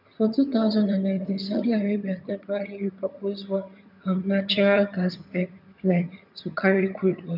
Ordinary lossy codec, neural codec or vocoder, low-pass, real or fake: none; vocoder, 22.05 kHz, 80 mel bands, HiFi-GAN; 5.4 kHz; fake